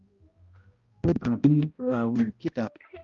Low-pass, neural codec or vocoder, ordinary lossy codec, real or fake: 7.2 kHz; codec, 16 kHz, 0.5 kbps, X-Codec, HuBERT features, trained on balanced general audio; Opus, 32 kbps; fake